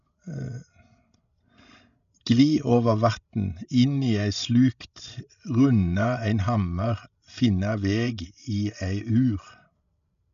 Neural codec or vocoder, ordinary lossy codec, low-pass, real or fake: codec, 16 kHz, 16 kbps, FreqCodec, larger model; none; 7.2 kHz; fake